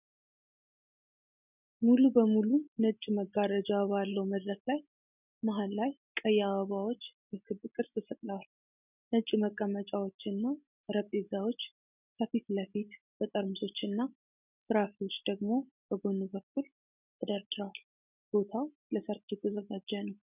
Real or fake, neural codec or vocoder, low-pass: real; none; 3.6 kHz